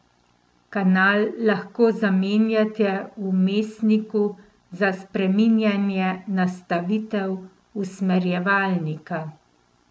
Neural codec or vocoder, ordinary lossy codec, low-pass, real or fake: none; none; none; real